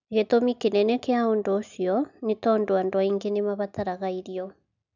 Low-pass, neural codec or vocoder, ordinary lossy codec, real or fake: 7.2 kHz; none; none; real